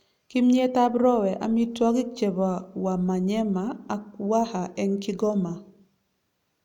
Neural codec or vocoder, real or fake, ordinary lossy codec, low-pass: none; real; none; 19.8 kHz